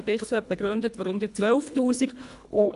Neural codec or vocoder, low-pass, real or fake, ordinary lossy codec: codec, 24 kHz, 1.5 kbps, HILCodec; 10.8 kHz; fake; AAC, 96 kbps